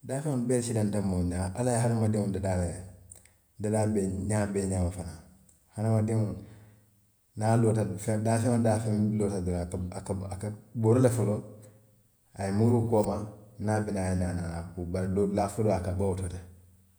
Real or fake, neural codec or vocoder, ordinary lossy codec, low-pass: fake; vocoder, 48 kHz, 128 mel bands, Vocos; none; none